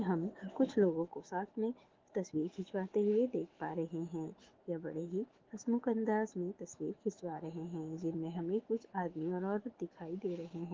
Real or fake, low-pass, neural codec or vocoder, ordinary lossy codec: fake; 7.2 kHz; autoencoder, 48 kHz, 128 numbers a frame, DAC-VAE, trained on Japanese speech; Opus, 16 kbps